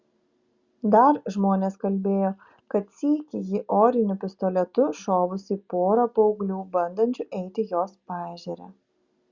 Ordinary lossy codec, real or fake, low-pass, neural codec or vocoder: Opus, 64 kbps; real; 7.2 kHz; none